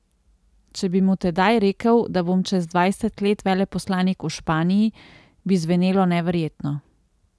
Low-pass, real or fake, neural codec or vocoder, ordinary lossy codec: none; real; none; none